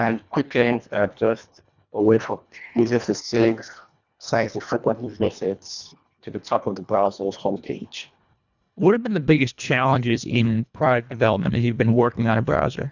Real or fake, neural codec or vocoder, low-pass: fake; codec, 24 kHz, 1.5 kbps, HILCodec; 7.2 kHz